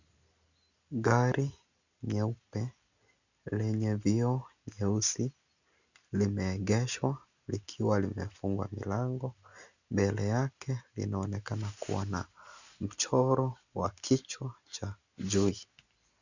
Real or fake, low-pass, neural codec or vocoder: real; 7.2 kHz; none